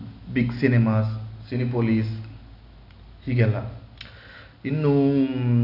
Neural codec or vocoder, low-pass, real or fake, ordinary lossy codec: none; 5.4 kHz; real; none